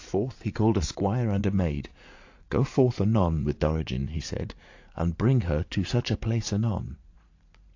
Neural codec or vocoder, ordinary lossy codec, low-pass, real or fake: vocoder, 44.1 kHz, 80 mel bands, Vocos; AAC, 48 kbps; 7.2 kHz; fake